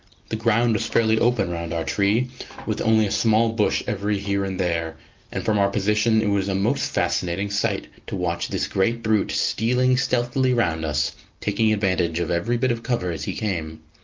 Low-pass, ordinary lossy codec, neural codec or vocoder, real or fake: 7.2 kHz; Opus, 32 kbps; none; real